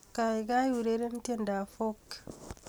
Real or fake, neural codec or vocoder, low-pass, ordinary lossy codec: real; none; none; none